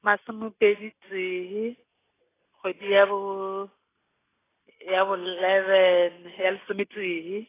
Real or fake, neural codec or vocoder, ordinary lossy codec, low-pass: real; none; AAC, 16 kbps; 3.6 kHz